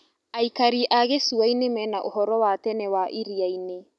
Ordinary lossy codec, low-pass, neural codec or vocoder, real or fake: none; none; none; real